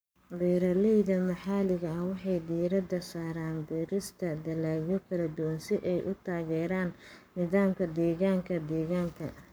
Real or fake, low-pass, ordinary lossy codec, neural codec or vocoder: fake; none; none; codec, 44.1 kHz, 7.8 kbps, Pupu-Codec